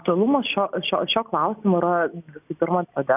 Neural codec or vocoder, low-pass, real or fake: none; 3.6 kHz; real